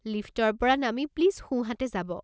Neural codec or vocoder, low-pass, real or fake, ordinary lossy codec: none; none; real; none